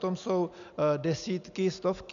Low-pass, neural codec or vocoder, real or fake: 7.2 kHz; none; real